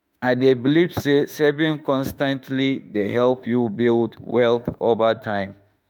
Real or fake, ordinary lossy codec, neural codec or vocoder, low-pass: fake; none; autoencoder, 48 kHz, 32 numbers a frame, DAC-VAE, trained on Japanese speech; none